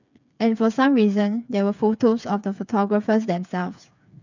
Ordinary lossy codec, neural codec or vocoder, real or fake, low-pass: none; codec, 16 kHz, 8 kbps, FreqCodec, smaller model; fake; 7.2 kHz